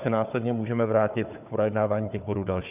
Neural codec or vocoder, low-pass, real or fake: codec, 16 kHz, 4 kbps, FunCodec, trained on Chinese and English, 50 frames a second; 3.6 kHz; fake